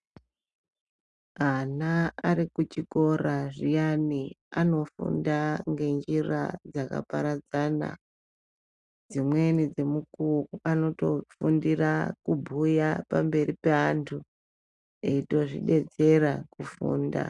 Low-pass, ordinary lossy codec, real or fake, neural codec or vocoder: 10.8 kHz; Opus, 64 kbps; real; none